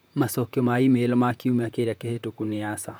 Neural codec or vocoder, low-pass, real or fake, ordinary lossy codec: vocoder, 44.1 kHz, 128 mel bands, Pupu-Vocoder; none; fake; none